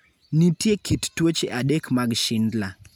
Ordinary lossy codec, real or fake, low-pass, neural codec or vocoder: none; real; none; none